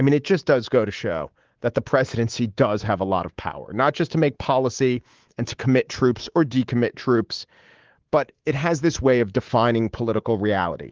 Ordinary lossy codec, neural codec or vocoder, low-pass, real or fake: Opus, 16 kbps; codec, 24 kHz, 3.1 kbps, DualCodec; 7.2 kHz; fake